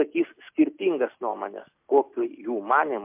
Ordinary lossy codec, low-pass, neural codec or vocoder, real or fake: MP3, 32 kbps; 3.6 kHz; none; real